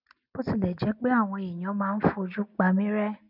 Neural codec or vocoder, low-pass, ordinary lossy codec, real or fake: none; 5.4 kHz; none; real